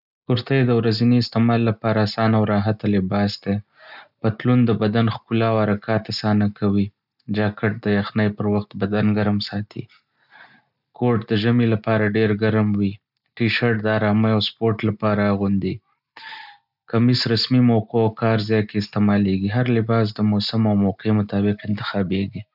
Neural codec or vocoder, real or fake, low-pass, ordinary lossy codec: none; real; 5.4 kHz; none